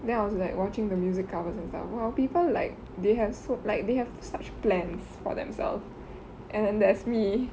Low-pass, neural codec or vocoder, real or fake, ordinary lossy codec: none; none; real; none